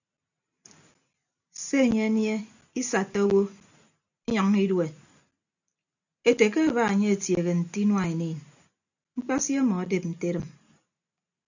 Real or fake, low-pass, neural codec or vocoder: real; 7.2 kHz; none